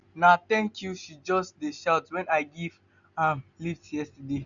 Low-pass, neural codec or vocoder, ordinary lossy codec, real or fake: 7.2 kHz; none; none; real